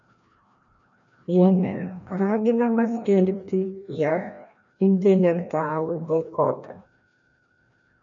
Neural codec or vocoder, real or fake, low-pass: codec, 16 kHz, 1 kbps, FreqCodec, larger model; fake; 7.2 kHz